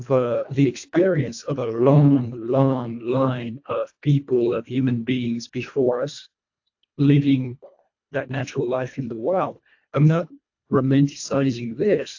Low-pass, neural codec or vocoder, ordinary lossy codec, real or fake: 7.2 kHz; codec, 24 kHz, 1.5 kbps, HILCodec; AAC, 48 kbps; fake